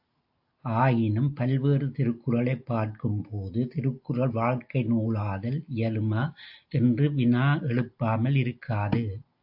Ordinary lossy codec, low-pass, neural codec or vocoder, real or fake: AAC, 48 kbps; 5.4 kHz; none; real